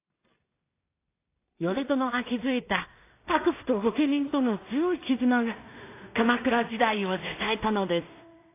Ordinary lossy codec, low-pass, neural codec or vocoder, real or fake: AAC, 24 kbps; 3.6 kHz; codec, 16 kHz in and 24 kHz out, 0.4 kbps, LongCat-Audio-Codec, two codebook decoder; fake